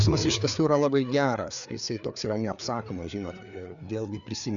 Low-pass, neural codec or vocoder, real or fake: 7.2 kHz; codec, 16 kHz, 4 kbps, FreqCodec, larger model; fake